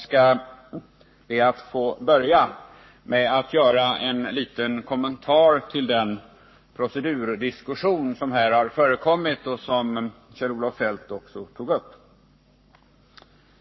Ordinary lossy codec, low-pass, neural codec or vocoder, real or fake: MP3, 24 kbps; 7.2 kHz; codec, 44.1 kHz, 7.8 kbps, Pupu-Codec; fake